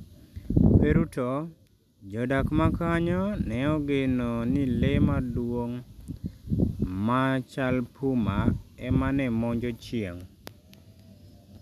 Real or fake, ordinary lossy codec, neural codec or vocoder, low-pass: real; none; none; 14.4 kHz